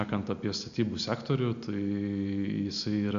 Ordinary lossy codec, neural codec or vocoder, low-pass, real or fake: AAC, 96 kbps; none; 7.2 kHz; real